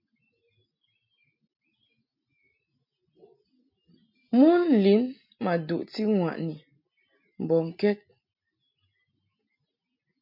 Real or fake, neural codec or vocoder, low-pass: real; none; 5.4 kHz